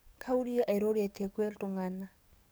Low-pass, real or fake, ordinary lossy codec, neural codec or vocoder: none; fake; none; codec, 44.1 kHz, 7.8 kbps, DAC